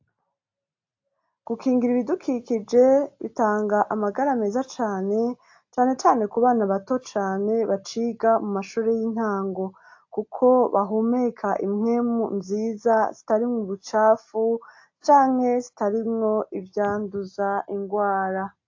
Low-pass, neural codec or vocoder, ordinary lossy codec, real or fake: 7.2 kHz; none; AAC, 48 kbps; real